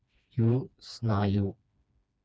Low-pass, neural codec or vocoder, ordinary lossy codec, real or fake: none; codec, 16 kHz, 2 kbps, FreqCodec, smaller model; none; fake